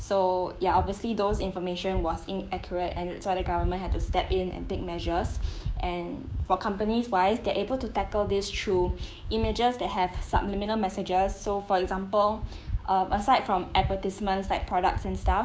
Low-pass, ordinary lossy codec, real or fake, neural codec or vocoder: none; none; fake; codec, 16 kHz, 6 kbps, DAC